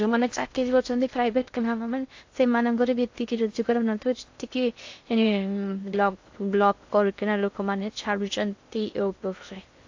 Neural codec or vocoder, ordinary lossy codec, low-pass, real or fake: codec, 16 kHz in and 24 kHz out, 0.6 kbps, FocalCodec, streaming, 2048 codes; AAC, 48 kbps; 7.2 kHz; fake